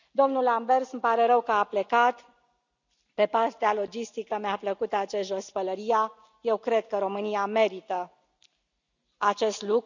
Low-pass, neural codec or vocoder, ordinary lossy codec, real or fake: 7.2 kHz; none; none; real